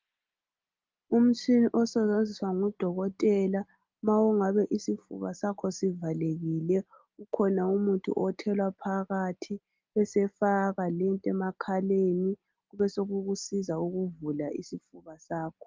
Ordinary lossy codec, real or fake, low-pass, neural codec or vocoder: Opus, 32 kbps; real; 7.2 kHz; none